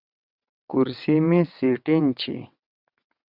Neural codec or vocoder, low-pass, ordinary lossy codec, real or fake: vocoder, 22.05 kHz, 80 mel bands, WaveNeXt; 5.4 kHz; Opus, 64 kbps; fake